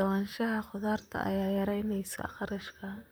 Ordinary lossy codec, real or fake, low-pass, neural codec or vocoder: none; fake; none; vocoder, 44.1 kHz, 128 mel bands, Pupu-Vocoder